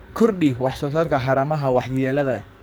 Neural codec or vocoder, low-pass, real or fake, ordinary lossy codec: codec, 44.1 kHz, 2.6 kbps, SNAC; none; fake; none